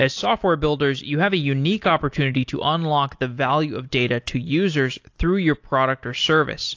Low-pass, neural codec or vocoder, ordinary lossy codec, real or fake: 7.2 kHz; none; AAC, 48 kbps; real